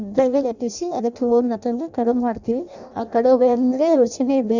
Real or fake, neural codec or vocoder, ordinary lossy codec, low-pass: fake; codec, 16 kHz in and 24 kHz out, 0.6 kbps, FireRedTTS-2 codec; none; 7.2 kHz